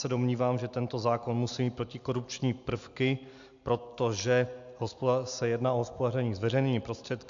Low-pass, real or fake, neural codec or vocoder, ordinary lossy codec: 7.2 kHz; real; none; AAC, 64 kbps